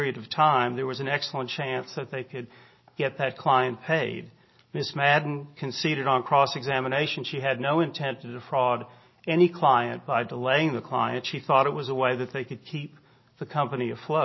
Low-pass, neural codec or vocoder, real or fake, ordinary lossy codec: 7.2 kHz; none; real; MP3, 24 kbps